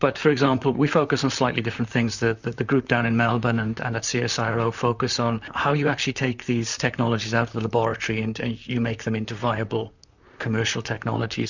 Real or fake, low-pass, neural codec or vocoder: fake; 7.2 kHz; vocoder, 44.1 kHz, 128 mel bands, Pupu-Vocoder